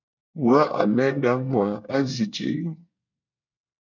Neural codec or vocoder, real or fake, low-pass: codec, 24 kHz, 1 kbps, SNAC; fake; 7.2 kHz